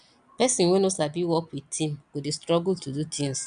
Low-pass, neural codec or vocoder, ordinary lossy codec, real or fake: 9.9 kHz; none; none; real